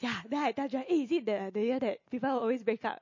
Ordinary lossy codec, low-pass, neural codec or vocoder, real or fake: MP3, 32 kbps; 7.2 kHz; none; real